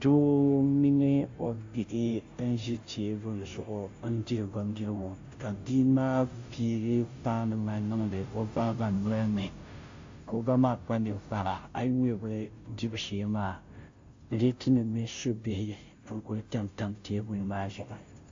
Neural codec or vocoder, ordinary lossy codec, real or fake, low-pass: codec, 16 kHz, 0.5 kbps, FunCodec, trained on Chinese and English, 25 frames a second; AAC, 48 kbps; fake; 7.2 kHz